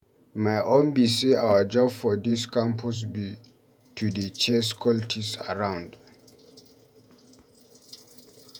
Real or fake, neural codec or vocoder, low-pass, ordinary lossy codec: fake; vocoder, 48 kHz, 128 mel bands, Vocos; none; none